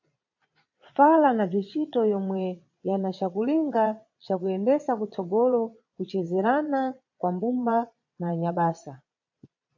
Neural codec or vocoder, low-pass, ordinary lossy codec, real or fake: vocoder, 22.05 kHz, 80 mel bands, Vocos; 7.2 kHz; AAC, 48 kbps; fake